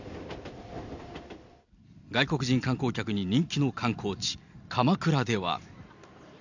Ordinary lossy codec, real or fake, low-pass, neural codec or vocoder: none; real; 7.2 kHz; none